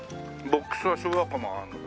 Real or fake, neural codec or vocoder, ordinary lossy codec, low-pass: real; none; none; none